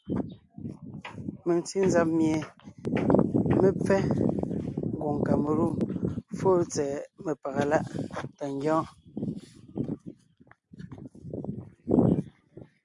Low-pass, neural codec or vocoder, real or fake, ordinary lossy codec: 10.8 kHz; none; real; AAC, 64 kbps